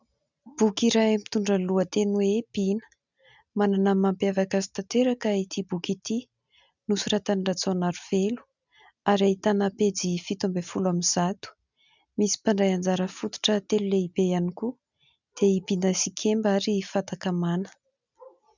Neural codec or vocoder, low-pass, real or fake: none; 7.2 kHz; real